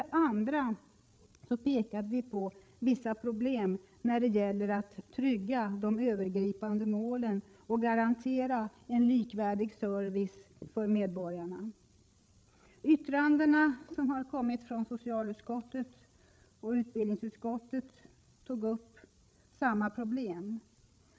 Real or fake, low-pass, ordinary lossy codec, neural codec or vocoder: fake; none; none; codec, 16 kHz, 8 kbps, FreqCodec, larger model